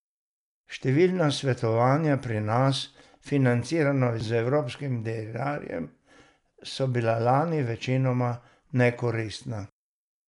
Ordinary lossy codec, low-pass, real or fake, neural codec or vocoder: none; 10.8 kHz; real; none